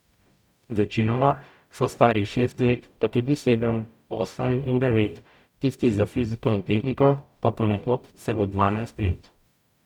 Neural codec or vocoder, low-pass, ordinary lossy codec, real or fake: codec, 44.1 kHz, 0.9 kbps, DAC; 19.8 kHz; none; fake